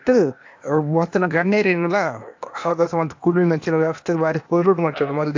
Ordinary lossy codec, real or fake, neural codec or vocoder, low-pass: AAC, 48 kbps; fake; codec, 16 kHz, 0.8 kbps, ZipCodec; 7.2 kHz